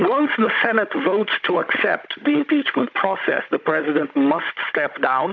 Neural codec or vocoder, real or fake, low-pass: codec, 16 kHz, 16 kbps, FunCodec, trained on Chinese and English, 50 frames a second; fake; 7.2 kHz